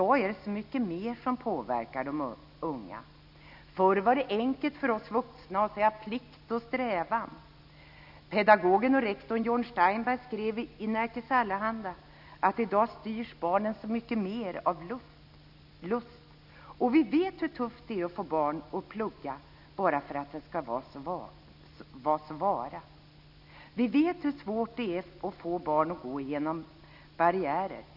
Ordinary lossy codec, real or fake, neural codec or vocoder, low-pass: none; real; none; 5.4 kHz